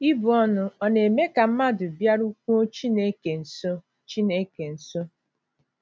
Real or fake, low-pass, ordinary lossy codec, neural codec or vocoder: real; none; none; none